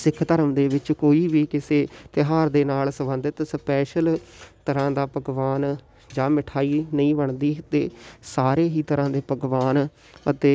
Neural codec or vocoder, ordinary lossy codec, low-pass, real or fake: none; none; none; real